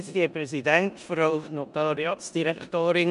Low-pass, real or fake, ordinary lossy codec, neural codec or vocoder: 10.8 kHz; fake; MP3, 96 kbps; codec, 16 kHz in and 24 kHz out, 0.9 kbps, LongCat-Audio-Codec, four codebook decoder